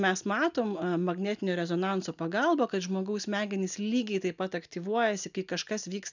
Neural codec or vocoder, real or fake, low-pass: none; real; 7.2 kHz